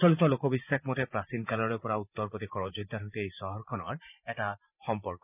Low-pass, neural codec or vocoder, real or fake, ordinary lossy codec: 3.6 kHz; vocoder, 44.1 kHz, 128 mel bands every 512 samples, BigVGAN v2; fake; none